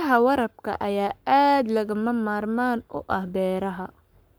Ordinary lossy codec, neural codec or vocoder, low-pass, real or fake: none; codec, 44.1 kHz, 7.8 kbps, Pupu-Codec; none; fake